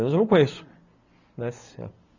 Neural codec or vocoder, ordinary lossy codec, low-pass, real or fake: none; none; 7.2 kHz; real